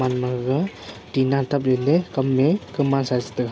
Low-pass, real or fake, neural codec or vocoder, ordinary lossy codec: none; real; none; none